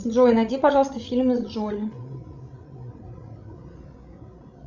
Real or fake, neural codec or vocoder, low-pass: fake; codec, 16 kHz, 16 kbps, FreqCodec, larger model; 7.2 kHz